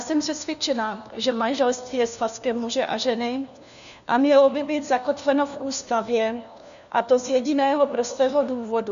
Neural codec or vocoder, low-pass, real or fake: codec, 16 kHz, 1 kbps, FunCodec, trained on LibriTTS, 50 frames a second; 7.2 kHz; fake